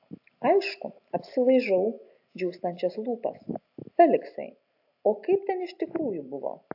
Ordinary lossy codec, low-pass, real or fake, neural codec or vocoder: AAC, 48 kbps; 5.4 kHz; real; none